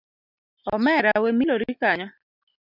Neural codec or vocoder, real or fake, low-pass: none; real; 5.4 kHz